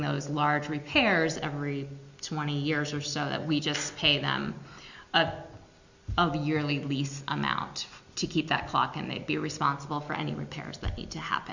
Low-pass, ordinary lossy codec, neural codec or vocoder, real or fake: 7.2 kHz; Opus, 64 kbps; none; real